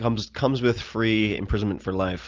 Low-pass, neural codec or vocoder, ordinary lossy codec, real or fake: 7.2 kHz; none; Opus, 24 kbps; real